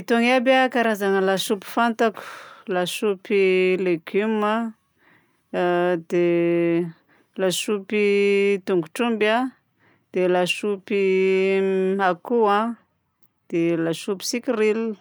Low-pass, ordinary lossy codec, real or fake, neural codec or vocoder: none; none; real; none